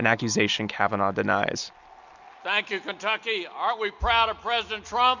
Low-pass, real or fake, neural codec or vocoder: 7.2 kHz; real; none